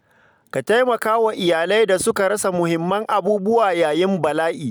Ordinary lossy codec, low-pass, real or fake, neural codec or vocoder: none; none; real; none